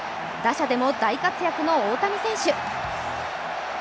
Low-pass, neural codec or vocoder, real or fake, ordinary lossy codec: none; none; real; none